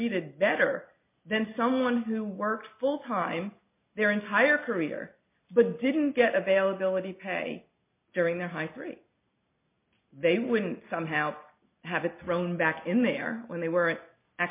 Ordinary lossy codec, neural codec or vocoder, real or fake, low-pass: MP3, 24 kbps; none; real; 3.6 kHz